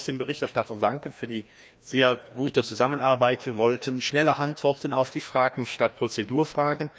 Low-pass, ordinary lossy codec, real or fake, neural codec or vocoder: none; none; fake; codec, 16 kHz, 1 kbps, FreqCodec, larger model